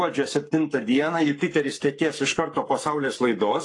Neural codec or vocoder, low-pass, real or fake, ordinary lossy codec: codec, 44.1 kHz, 7.8 kbps, Pupu-Codec; 10.8 kHz; fake; AAC, 48 kbps